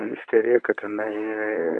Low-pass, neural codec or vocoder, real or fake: 10.8 kHz; codec, 24 kHz, 0.9 kbps, WavTokenizer, medium speech release version 1; fake